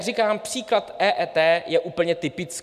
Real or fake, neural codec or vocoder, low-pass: real; none; 14.4 kHz